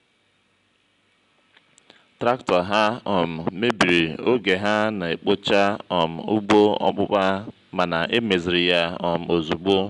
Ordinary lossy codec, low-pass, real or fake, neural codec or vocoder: none; 10.8 kHz; real; none